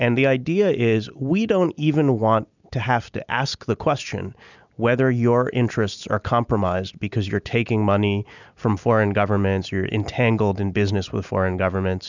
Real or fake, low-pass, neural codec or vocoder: real; 7.2 kHz; none